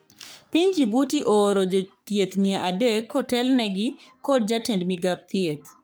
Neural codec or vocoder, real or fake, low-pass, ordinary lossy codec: codec, 44.1 kHz, 3.4 kbps, Pupu-Codec; fake; none; none